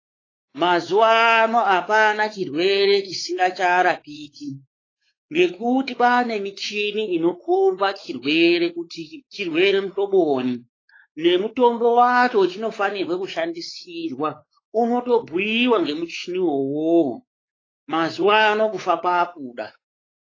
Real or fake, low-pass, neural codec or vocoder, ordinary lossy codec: fake; 7.2 kHz; codec, 16 kHz, 4 kbps, X-Codec, WavLM features, trained on Multilingual LibriSpeech; AAC, 32 kbps